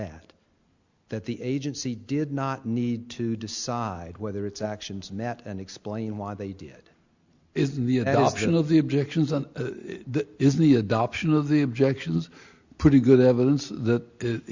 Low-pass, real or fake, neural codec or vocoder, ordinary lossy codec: 7.2 kHz; real; none; Opus, 64 kbps